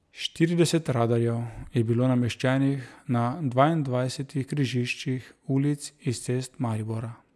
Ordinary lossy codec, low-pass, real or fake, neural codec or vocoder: none; none; real; none